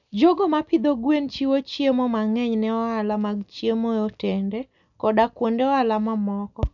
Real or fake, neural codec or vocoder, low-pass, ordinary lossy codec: real; none; 7.2 kHz; none